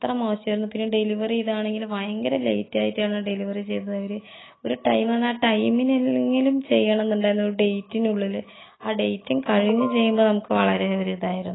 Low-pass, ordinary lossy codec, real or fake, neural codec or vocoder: 7.2 kHz; AAC, 16 kbps; real; none